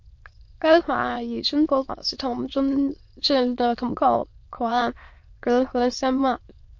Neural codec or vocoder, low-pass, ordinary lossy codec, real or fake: autoencoder, 22.05 kHz, a latent of 192 numbers a frame, VITS, trained on many speakers; 7.2 kHz; MP3, 48 kbps; fake